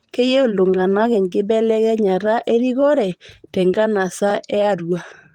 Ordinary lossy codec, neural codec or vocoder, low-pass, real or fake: Opus, 24 kbps; vocoder, 44.1 kHz, 128 mel bands, Pupu-Vocoder; 19.8 kHz; fake